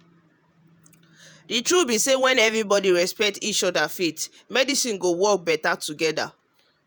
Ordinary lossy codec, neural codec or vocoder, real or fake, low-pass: none; vocoder, 48 kHz, 128 mel bands, Vocos; fake; none